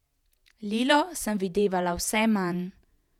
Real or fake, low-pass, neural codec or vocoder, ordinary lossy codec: fake; 19.8 kHz; vocoder, 48 kHz, 128 mel bands, Vocos; none